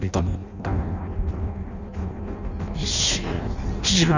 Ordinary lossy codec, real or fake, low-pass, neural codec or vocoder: none; fake; 7.2 kHz; codec, 16 kHz in and 24 kHz out, 0.6 kbps, FireRedTTS-2 codec